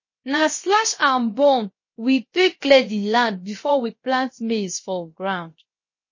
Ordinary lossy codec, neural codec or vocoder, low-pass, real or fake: MP3, 32 kbps; codec, 16 kHz, 0.3 kbps, FocalCodec; 7.2 kHz; fake